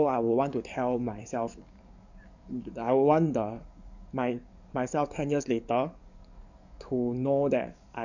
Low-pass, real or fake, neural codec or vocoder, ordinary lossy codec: 7.2 kHz; fake; codec, 44.1 kHz, 7.8 kbps, DAC; none